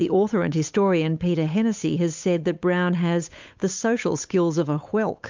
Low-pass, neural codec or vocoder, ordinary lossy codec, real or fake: 7.2 kHz; codec, 16 kHz, 8 kbps, FunCodec, trained on Chinese and English, 25 frames a second; MP3, 64 kbps; fake